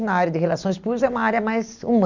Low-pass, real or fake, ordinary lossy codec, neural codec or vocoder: 7.2 kHz; real; none; none